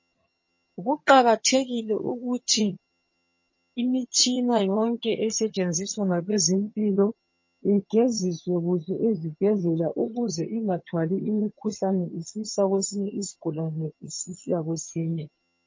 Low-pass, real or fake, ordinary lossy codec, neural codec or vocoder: 7.2 kHz; fake; MP3, 32 kbps; vocoder, 22.05 kHz, 80 mel bands, HiFi-GAN